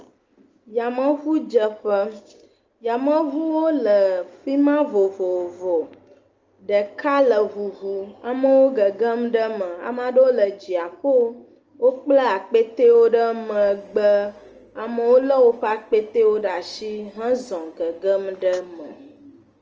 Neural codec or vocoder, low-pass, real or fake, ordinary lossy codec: none; 7.2 kHz; real; Opus, 24 kbps